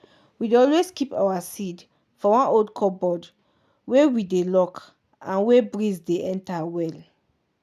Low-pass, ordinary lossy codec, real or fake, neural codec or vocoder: 14.4 kHz; none; real; none